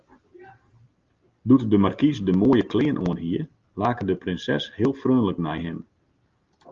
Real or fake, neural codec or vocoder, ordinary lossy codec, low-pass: real; none; Opus, 32 kbps; 7.2 kHz